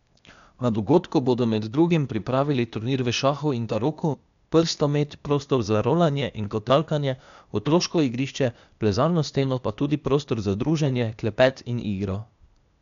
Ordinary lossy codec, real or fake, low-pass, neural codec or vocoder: MP3, 96 kbps; fake; 7.2 kHz; codec, 16 kHz, 0.8 kbps, ZipCodec